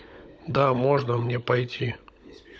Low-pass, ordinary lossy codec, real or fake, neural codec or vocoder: none; none; fake; codec, 16 kHz, 16 kbps, FunCodec, trained on LibriTTS, 50 frames a second